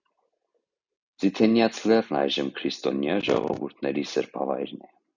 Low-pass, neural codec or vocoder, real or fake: 7.2 kHz; none; real